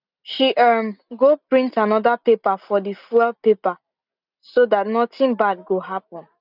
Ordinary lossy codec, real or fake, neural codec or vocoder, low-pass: none; real; none; 5.4 kHz